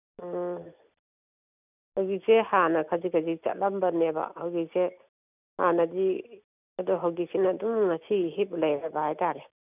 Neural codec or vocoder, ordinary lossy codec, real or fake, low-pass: none; none; real; 3.6 kHz